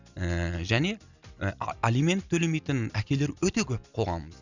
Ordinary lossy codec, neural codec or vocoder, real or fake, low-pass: none; none; real; 7.2 kHz